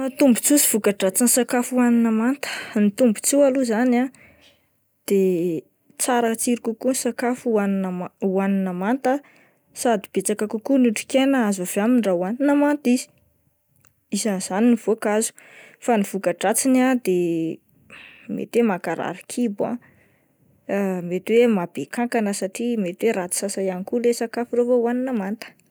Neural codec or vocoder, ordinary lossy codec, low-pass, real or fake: none; none; none; real